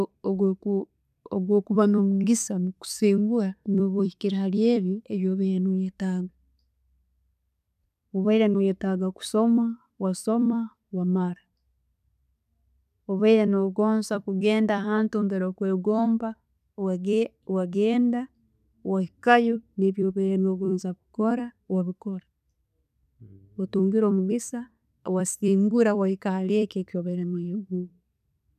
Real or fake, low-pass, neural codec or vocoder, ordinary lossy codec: fake; 14.4 kHz; vocoder, 44.1 kHz, 128 mel bands every 256 samples, BigVGAN v2; none